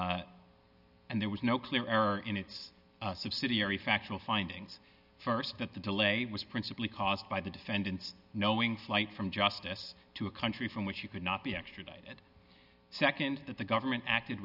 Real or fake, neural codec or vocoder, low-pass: real; none; 5.4 kHz